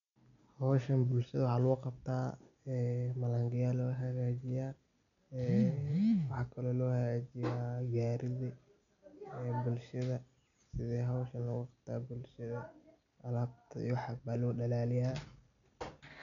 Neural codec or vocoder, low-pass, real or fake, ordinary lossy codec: none; 7.2 kHz; real; none